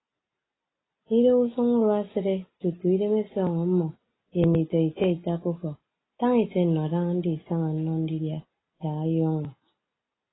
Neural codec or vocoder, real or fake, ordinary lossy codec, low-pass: none; real; AAC, 16 kbps; 7.2 kHz